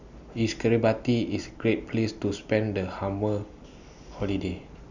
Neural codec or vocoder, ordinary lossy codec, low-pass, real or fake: none; none; 7.2 kHz; real